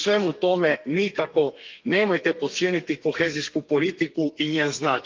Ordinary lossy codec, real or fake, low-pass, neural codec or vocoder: Opus, 32 kbps; fake; 7.2 kHz; codec, 32 kHz, 1.9 kbps, SNAC